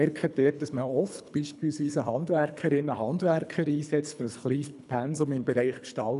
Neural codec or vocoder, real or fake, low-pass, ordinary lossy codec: codec, 24 kHz, 3 kbps, HILCodec; fake; 10.8 kHz; none